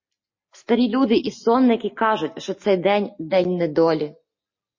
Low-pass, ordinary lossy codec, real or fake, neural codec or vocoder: 7.2 kHz; MP3, 32 kbps; real; none